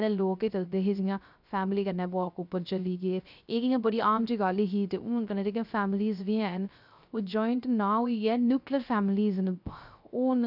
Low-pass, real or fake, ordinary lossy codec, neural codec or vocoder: 5.4 kHz; fake; none; codec, 16 kHz, 0.3 kbps, FocalCodec